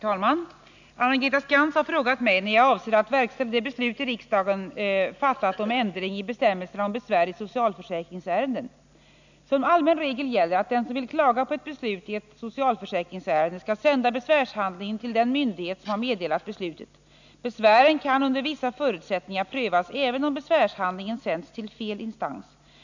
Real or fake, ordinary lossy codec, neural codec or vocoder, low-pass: real; none; none; 7.2 kHz